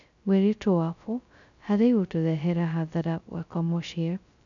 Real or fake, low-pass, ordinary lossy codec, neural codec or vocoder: fake; 7.2 kHz; none; codec, 16 kHz, 0.2 kbps, FocalCodec